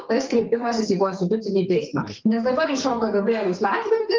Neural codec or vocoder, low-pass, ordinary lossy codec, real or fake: codec, 44.1 kHz, 2.6 kbps, SNAC; 7.2 kHz; Opus, 32 kbps; fake